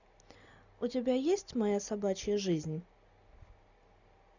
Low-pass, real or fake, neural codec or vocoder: 7.2 kHz; real; none